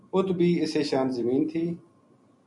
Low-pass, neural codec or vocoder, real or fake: 10.8 kHz; none; real